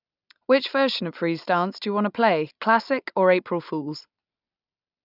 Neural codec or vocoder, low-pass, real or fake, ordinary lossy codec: none; 5.4 kHz; real; none